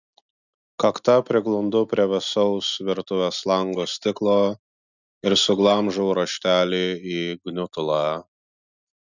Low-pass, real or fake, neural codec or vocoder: 7.2 kHz; real; none